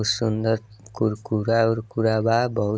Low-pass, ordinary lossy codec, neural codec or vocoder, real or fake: none; none; none; real